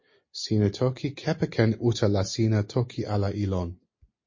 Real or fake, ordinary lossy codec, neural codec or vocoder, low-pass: real; MP3, 32 kbps; none; 7.2 kHz